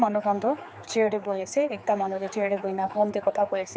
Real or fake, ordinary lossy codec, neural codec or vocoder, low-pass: fake; none; codec, 16 kHz, 4 kbps, X-Codec, HuBERT features, trained on general audio; none